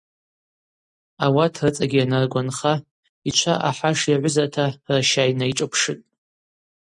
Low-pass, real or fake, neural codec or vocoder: 10.8 kHz; real; none